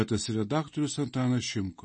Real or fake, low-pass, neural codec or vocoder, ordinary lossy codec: real; 10.8 kHz; none; MP3, 32 kbps